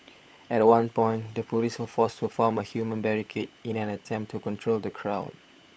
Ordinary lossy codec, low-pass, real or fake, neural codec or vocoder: none; none; fake; codec, 16 kHz, 16 kbps, FunCodec, trained on LibriTTS, 50 frames a second